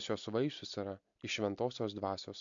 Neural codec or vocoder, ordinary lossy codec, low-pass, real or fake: none; MP3, 64 kbps; 7.2 kHz; real